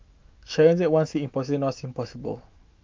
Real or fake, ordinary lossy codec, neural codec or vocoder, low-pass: fake; Opus, 24 kbps; autoencoder, 48 kHz, 128 numbers a frame, DAC-VAE, trained on Japanese speech; 7.2 kHz